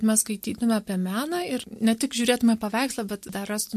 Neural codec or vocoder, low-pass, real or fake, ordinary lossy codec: none; 14.4 kHz; real; MP3, 64 kbps